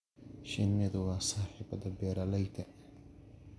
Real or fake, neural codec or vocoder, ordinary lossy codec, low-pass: real; none; none; none